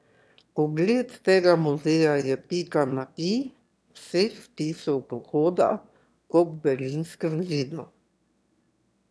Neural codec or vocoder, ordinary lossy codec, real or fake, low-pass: autoencoder, 22.05 kHz, a latent of 192 numbers a frame, VITS, trained on one speaker; none; fake; none